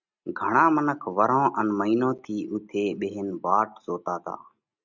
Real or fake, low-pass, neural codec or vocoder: real; 7.2 kHz; none